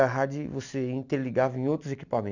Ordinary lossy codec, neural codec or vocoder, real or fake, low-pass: none; none; real; 7.2 kHz